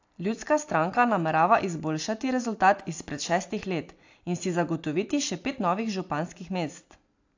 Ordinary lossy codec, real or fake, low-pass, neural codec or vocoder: AAC, 48 kbps; real; 7.2 kHz; none